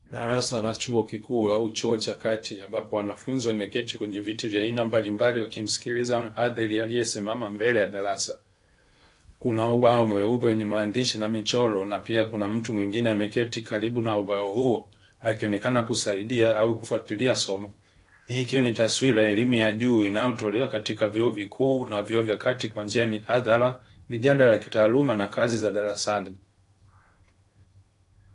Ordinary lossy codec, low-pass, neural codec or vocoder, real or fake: AAC, 48 kbps; 10.8 kHz; codec, 16 kHz in and 24 kHz out, 0.8 kbps, FocalCodec, streaming, 65536 codes; fake